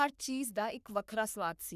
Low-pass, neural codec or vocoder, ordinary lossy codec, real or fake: 14.4 kHz; codec, 44.1 kHz, 3.4 kbps, Pupu-Codec; none; fake